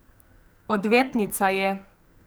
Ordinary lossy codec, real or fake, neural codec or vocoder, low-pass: none; fake; codec, 44.1 kHz, 2.6 kbps, SNAC; none